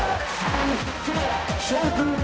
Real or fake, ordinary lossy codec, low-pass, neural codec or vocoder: fake; none; none; codec, 16 kHz, 0.5 kbps, X-Codec, HuBERT features, trained on balanced general audio